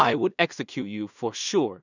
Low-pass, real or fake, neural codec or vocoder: 7.2 kHz; fake; codec, 16 kHz in and 24 kHz out, 0.4 kbps, LongCat-Audio-Codec, two codebook decoder